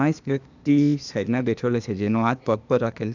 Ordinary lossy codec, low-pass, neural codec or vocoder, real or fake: none; 7.2 kHz; codec, 16 kHz, 0.8 kbps, ZipCodec; fake